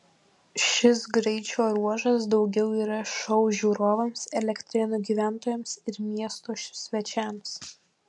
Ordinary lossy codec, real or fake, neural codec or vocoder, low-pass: MP3, 64 kbps; real; none; 10.8 kHz